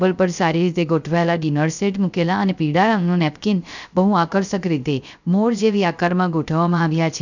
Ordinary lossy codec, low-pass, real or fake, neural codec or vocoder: none; 7.2 kHz; fake; codec, 16 kHz, 0.3 kbps, FocalCodec